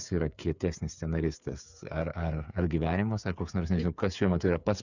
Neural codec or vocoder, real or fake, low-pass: codec, 16 kHz, 8 kbps, FreqCodec, smaller model; fake; 7.2 kHz